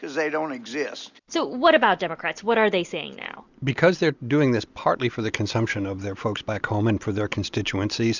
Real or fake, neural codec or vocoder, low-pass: real; none; 7.2 kHz